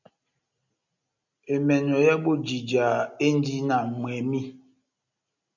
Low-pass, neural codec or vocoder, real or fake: 7.2 kHz; none; real